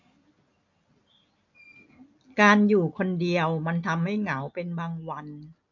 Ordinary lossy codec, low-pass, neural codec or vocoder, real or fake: MP3, 48 kbps; 7.2 kHz; none; real